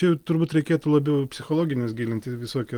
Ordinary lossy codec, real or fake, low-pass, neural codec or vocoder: Opus, 24 kbps; real; 19.8 kHz; none